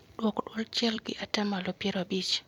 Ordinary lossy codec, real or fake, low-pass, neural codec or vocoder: MP3, 96 kbps; fake; 19.8 kHz; vocoder, 44.1 kHz, 128 mel bands every 256 samples, BigVGAN v2